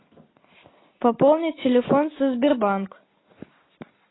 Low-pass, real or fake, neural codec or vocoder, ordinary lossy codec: 7.2 kHz; real; none; AAC, 16 kbps